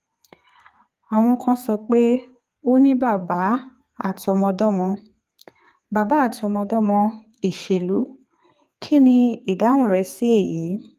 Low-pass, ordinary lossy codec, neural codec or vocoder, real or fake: 14.4 kHz; Opus, 32 kbps; codec, 32 kHz, 1.9 kbps, SNAC; fake